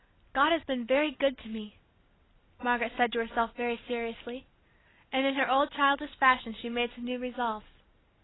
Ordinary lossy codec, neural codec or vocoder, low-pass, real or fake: AAC, 16 kbps; none; 7.2 kHz; real